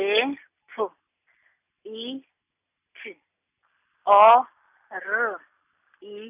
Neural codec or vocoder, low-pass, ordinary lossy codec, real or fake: none; 3.6 kHz; none; real